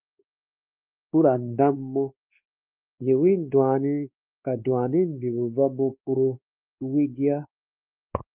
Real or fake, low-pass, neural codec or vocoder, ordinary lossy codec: fake; 3.6 kHz; codec, 16 kHz, 2 kbps, X-Codec, WavLM features, trained on Multilingual LibriSpeech; Opus, 32 kbps